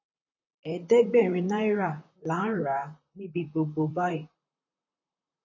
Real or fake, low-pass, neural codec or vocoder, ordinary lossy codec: fake; 7.2 kHz; vocoder, 44.1 kHz, 128 mel bands, Pupu-Vocoder; MP3, 32 kbps